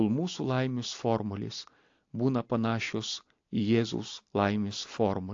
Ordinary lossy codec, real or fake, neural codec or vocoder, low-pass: AAC, 48 kbps; real; none; 7.2 kHz